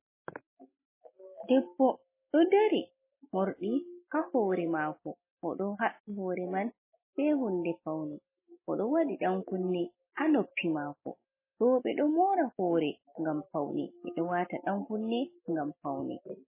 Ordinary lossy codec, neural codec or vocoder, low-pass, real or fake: MP3, 16 kbps; none; 3.6 kHz; real